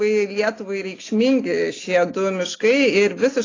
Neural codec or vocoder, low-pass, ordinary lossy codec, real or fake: none; 7.2 kHz; AAC, 32 kbps; real